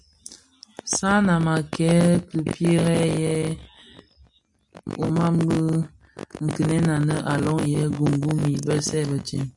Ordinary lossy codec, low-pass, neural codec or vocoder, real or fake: MP3, 96 kbps; 10.8 kHz; none; real